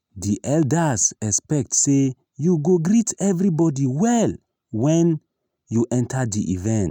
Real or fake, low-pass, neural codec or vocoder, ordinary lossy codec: real; 19.8 kHz; none; none